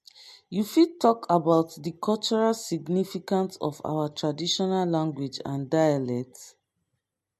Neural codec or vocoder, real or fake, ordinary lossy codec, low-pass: none; real; MP3, 64 kbps; 14.4 kHz